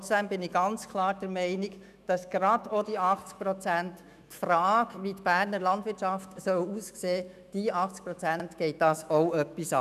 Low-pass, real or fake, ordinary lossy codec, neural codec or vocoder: 14.4 kHz; fake; none; autoencoder, 48 kHz, 128 numbers a frame, DAC-VAE, trained on Japanese speech